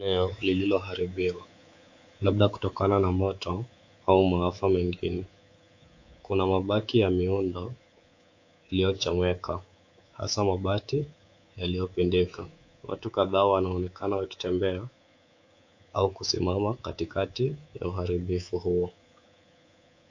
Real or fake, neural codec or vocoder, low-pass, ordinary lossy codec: fake; codec, 24 kHz, 3.1 kbps, DualCodec; 7.2 kHz; AAC, 48 kbps